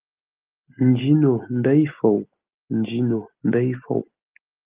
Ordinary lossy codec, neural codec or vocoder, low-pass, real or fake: Opus, 32 kbps; none; 3.6 kHz; real